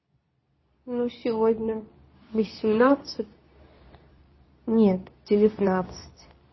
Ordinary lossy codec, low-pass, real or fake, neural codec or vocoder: MP3, 24 kbps; 7.2 kHz; fake; codec, 24 kHz, 0.9 kbps, WavTokenizer, medium speech release version 2